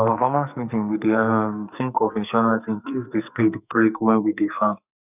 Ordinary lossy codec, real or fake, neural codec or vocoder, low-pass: none; fake; codec, 44.1 kHz, 2.6 kbps, SNAC; 3.6 kHz